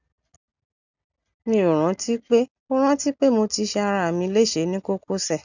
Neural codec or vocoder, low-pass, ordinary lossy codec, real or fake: none; 7.2 kHz; none; real